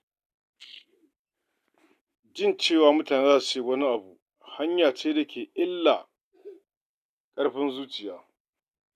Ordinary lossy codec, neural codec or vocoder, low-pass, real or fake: none; none; 14.4 kHz; real